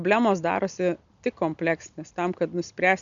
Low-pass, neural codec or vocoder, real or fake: 7.2 kHz; none; real